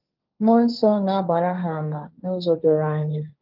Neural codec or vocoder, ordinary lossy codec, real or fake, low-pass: codec, 16 kHz, 1.1 kbps, Voila-Tokenizer; Opus, 24 kbps; fake; 5.4 kHz